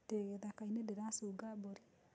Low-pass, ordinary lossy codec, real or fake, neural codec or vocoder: none; none; real; none